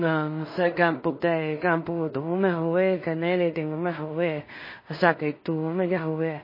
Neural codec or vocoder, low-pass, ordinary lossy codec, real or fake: codec, 16 kHz in and 24 kHz out, 0.4 kbps, LongCat-Audio-Codec, two codebook decoder; 5.4 kHz; MP3, 24 kbps; fake